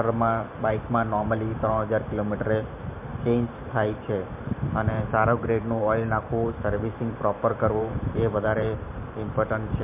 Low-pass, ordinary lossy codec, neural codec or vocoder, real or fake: 3.6 kHz; MP3, 32 kbps; vocoder, 44.1 kHz, 128 mel bands every 512 samples, BigVGAN v2; fake